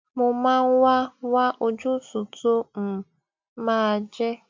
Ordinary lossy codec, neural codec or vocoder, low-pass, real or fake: MP3, 64 kbps; none; 7.2 kHz; real